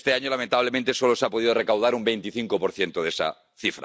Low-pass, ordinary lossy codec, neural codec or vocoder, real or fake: none; none; none; real